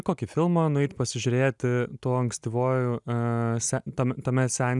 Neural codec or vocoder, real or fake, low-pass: none; real; 10.8 kHz